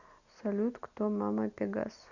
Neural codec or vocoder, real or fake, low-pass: none; real; 7.2 kHz